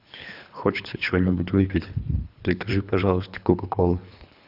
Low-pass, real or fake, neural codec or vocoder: 5.4 kHz; fake; codec, 24 kHz, 3 kbps, HILCodec